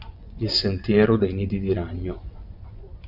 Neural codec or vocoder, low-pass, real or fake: vocoder, 24 kHz, 100 mel bands, Vocos; 5.4 kHz; fake